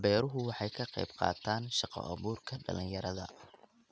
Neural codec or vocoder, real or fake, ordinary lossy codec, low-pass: none; real; none; none